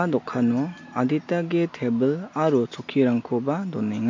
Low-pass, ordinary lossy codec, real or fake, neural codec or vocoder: 7.2 kHz; MP3, 48 kbps; real; none